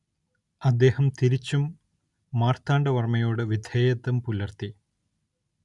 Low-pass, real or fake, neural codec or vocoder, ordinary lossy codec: 10.8 kHz; real; none; none